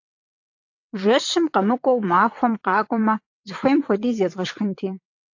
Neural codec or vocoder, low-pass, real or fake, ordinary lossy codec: vocoder, 22.05 kHz, 80 mel bands, WaveNeXt; 7.2 kHz; fake; AAC, 48 kbps